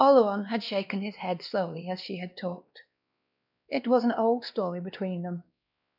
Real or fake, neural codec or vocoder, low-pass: fake; codec, 16 kHz, 2 kbps, X-Codec, WavLM features, trained on Multilingual LibriSpeech; 5.4 kHz